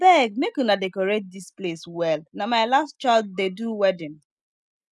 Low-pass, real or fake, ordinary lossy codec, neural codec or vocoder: none; real; none; none